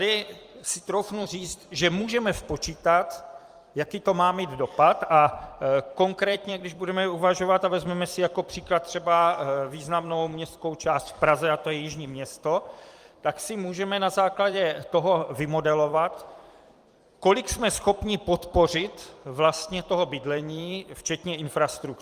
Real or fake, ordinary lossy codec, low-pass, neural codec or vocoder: fake; Opus, 32 kbps; 14.4 kHz; vocoder, 44.1 kHz, 128 mel bands every 256 samples, BigVGAN v2